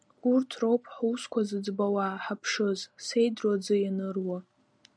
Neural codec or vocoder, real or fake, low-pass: none; real; 9.9 kHz